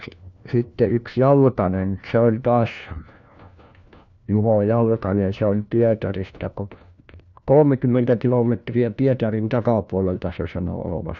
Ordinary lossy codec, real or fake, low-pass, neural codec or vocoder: none; fake; 7.2 kHz; codec, 16 kHz, 1 kbps, FunCodec, trained on LibriTTS, 50 frames a second